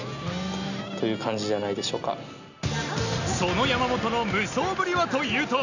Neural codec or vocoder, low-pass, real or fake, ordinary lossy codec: none; 7.2 kHz; real; none